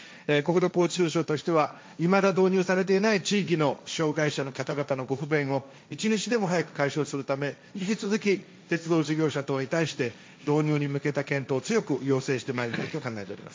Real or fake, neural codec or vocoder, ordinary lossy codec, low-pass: fake; codec, 16 kHz, 1.1 kbps, Voila-Tokenizer; none; none